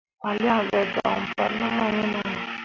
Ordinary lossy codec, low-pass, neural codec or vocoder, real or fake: AAC, 48 kbps; 7.2 kHz; none; real